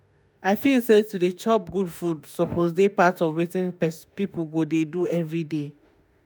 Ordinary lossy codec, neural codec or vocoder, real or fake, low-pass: none; autoencoder, 48 kHz, 32 numbers a frame, DAC-VAE, trained on Japanese speech; fake; none